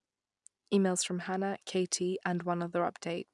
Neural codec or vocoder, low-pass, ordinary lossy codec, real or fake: none; 10.8 kHz; none; real